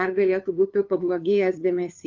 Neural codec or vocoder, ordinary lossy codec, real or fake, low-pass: codec, 16 kHz, 2 kbps, FunCodec, trained on LibriTTS, 25 frames a second; Opus, 24 kbps; fake; 7.2 kHz